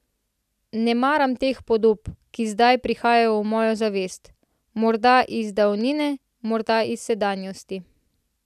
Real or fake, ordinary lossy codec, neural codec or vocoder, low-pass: real; none; none; 14.4 kHz